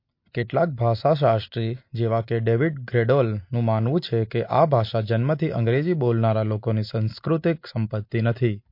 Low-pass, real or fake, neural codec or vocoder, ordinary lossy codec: 5.4 kHz; real; none; MP3, 32 kbps